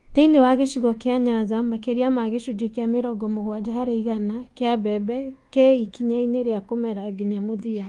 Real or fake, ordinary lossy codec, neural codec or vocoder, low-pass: fake; Opus, 24 kbps; codec, 24 kHz, 1.2 kbps, DualCodec; 10.8 kHz